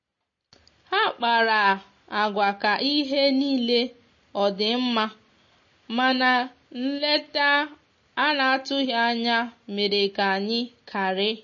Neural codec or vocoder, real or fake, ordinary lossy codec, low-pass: none; real; MP3, 32 kbps; 7.2 kHz